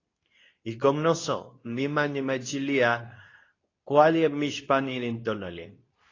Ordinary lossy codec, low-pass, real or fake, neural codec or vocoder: AAC, 32 kbps; 7.2 kHz; fake; codec, 24 kHz, 0.9 kbps, WavTokenizer, medium speech release version 2